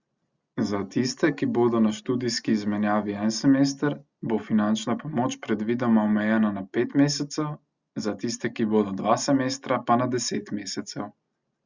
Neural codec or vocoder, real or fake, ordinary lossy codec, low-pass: none; real; Opus, 64 kbps; 7.2 kHz